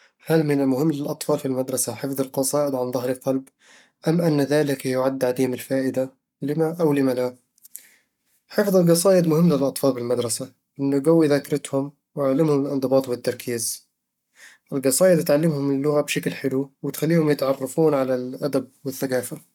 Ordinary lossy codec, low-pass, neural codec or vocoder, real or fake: none; 19.8 kHz; codec, 44.1 kHz, 7.8 kbps, Pupu-Codec; fake